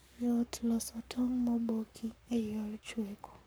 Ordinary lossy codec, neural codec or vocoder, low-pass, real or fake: none; codec, 44.1 kHz, 7.8 kbps, Pupu-Codec; none; fake